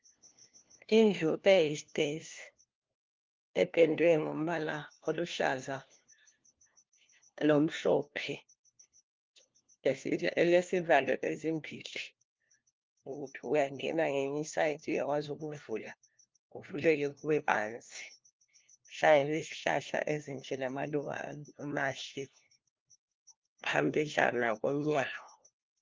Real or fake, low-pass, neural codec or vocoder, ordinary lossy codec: fake; 7.2 kHz; codec, 16 kHz, 1 kbps, FunCodec, trained on LibriTTS, 50 frames a second; Opus, 32 kbps